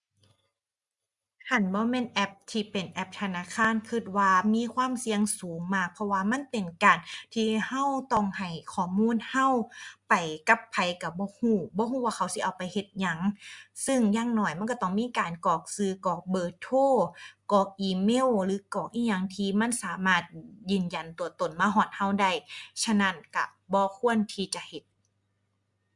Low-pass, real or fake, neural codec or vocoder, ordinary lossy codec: 10.8 kHz; real; none; Opus, 64 kbps